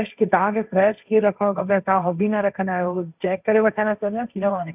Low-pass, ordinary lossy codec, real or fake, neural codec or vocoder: 3.6 kHz; none; fake; codec, 16 kHz, 1.1 kbps, Voila-Tokenizer